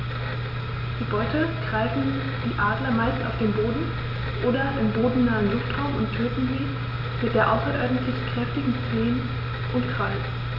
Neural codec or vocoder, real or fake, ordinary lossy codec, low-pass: none; real; AAC, 48 kbps; 5.4 kHz